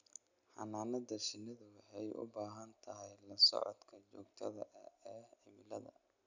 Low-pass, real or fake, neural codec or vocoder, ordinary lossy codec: 7.2 kHz; real; none; none